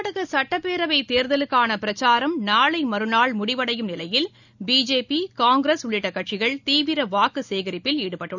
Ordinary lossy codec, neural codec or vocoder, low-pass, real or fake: none; none; 7.2 kHz; real